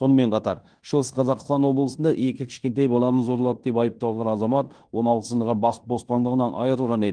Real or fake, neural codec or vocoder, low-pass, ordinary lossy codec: fake; codec, 16 kHz in and 24 kHz out, 0.9 kbps, LongCat-Audio-Codec, fine tuned four codebook decoder; 9.9 kHz; Opus, 24 kbps